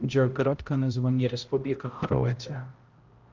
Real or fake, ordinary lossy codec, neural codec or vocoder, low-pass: fake; Opus, 32 kbps; codec, 16 kHz, 0.5 kbps, X-Codec, HuBERT features, trained on balanced general audio; 7.2 kHz